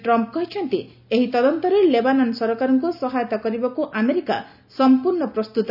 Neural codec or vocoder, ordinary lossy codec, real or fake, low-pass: none; none; real; 5.4 kHz